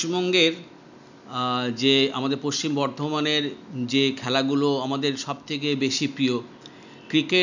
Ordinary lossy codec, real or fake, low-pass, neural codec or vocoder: none; real; 7.2 kHz; none